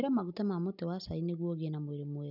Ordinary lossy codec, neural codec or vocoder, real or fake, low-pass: none; none; real; 5.4 kHz